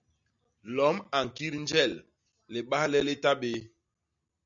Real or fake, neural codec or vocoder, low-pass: real; none; 7.2 kHz